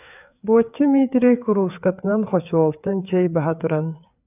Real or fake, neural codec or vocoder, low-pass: fake; codec, 16 kHz, 4 kbps, FreqCodec, larger model; 3.6 kHz